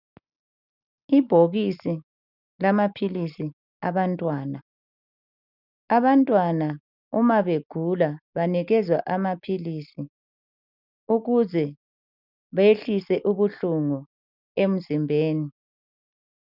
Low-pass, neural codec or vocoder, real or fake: 5.4 kHz; none; real